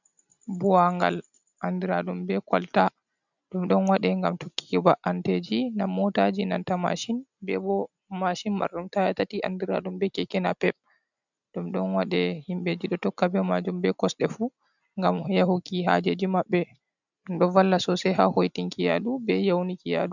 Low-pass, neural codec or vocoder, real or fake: 7.2 kHz; none; real